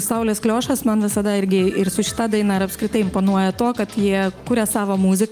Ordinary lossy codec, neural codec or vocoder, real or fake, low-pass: Opus, 32 kbps; none; real; 14.4 kHz